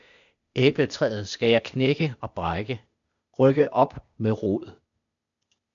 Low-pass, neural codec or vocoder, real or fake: 7.2 kHz; codec, 16 kHz, 0.8 kbps, ZipCodec; fake